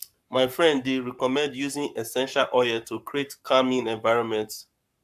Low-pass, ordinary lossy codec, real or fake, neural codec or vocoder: 14.4 kHz; AAC, 96 kbps; fake; codec, 44.1 kHz, 7.8 kbps, Pupu-Codec